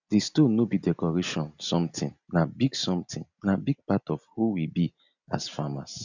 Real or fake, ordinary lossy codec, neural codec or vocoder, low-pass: real; none; none; 7.2 kHz